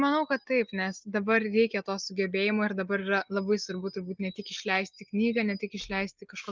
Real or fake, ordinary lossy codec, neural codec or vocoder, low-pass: real; Opus, 32 kbps; none; 7.2 kHz